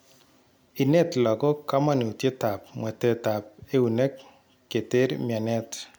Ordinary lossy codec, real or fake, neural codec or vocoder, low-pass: none; real; none; none